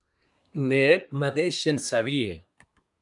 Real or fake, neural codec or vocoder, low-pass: fake; codec, 24 kHz, 1 kbps, SNAC; 10.8 kHz